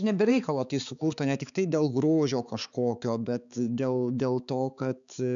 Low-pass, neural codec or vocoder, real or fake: 7.2 kHz; codec, 16 kHz, 4 kbps, X-Codec, HuBERT features, trained on balanced general audio; fake